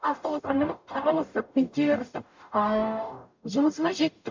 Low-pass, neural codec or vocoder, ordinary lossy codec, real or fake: 7.2 kHz; codec, 44.1 kHz, 0.9 kbps, DAC; MP3, 64 kbps; fake